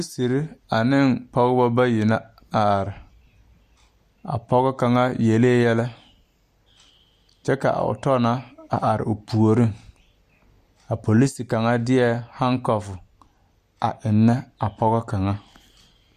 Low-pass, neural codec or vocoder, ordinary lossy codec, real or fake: 14.4 kHz; none; Opus, 64 kbps; real